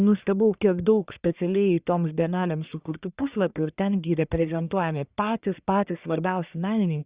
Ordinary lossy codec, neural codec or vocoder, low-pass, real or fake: Opus, 64 kbps; codec, 44.1 kHz, 1.7 kbps, Pupu-Codec; 3.6 kHz; fake